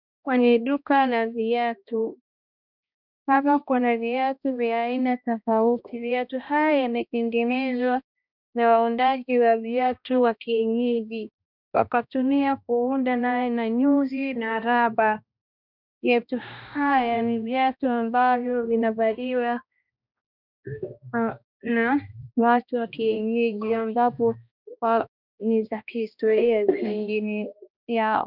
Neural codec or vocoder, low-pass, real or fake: codec, 16 kHz, 1 kbps, X-Codec, HuBERT features, trained on balanced general audio; 5.4 kHz; fake